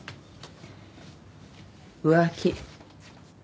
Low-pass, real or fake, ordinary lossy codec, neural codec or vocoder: none; real; none; none